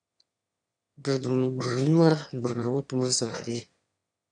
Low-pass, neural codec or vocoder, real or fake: 9.9 kHz; autoencoder, 22.05 kHz, a latent of 192 numbers a frame, VITS, trained on one speaker; fake